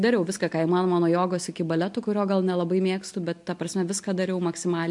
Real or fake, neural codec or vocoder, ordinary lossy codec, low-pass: real; none; MP3, 64 kbps; 10.8 kHz